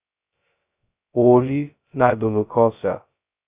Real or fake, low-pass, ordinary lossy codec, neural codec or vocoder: fake; 3.6 kHz; Opus, 64 kbps; codec, 16 kHz, 0.2 kbps, FocalCodec